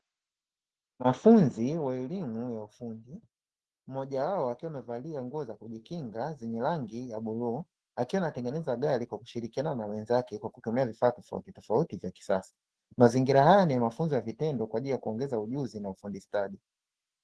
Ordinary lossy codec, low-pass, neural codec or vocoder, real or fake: Opus, 16 kbps; 10.8 kHz; autoencoder, 48 kHz, 128 numbers a frame, DAC-VAE, trained on Japanese speech; fake